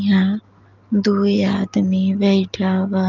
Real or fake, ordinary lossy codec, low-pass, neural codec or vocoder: real; Opus, 32 kbps; 7.2 kHz; none